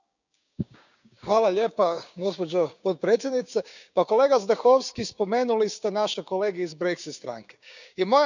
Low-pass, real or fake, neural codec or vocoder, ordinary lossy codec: 7.2 kHz; fake; codec, 16 kHz, 6 kbps, DAC; none